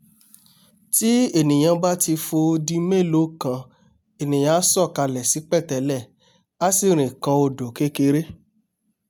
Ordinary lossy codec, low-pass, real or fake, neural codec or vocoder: none; none; real; none